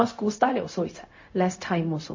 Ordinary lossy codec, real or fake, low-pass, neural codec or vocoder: MP3, 32 kbps; fake; 7.2 kHz; codec, 16 kHz, 0.4 kbps, LongCat-Audio-Codec